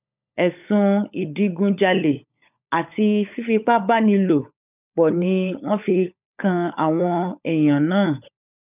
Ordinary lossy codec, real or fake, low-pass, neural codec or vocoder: none; fake; 3.6 kHz; codec, 16 kHz, 16 kbps, FunCodec, trained on LibriTTS, 50 frames a second